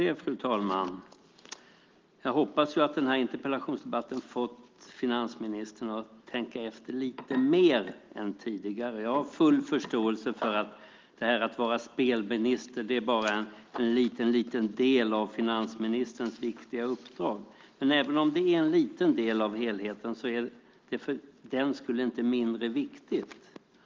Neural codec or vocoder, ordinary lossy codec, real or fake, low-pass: none; Opus, 32 kbps; real; 7.2 kHz